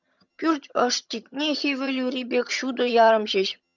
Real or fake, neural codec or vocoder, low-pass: fake; vocoder, 22.05 kHz, 80 mel bands, HiFi-GAN; 7.2 kHz